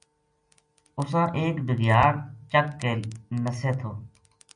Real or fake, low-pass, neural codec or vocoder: real; 9.9 kHz; none